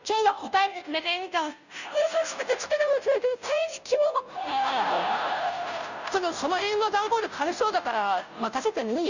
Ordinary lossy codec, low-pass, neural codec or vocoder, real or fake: none; 7.2 kHz; codec, 16 kHz, 0.5 kbps, FunCodec, trained on Chinese and English, 25 frames a second; fake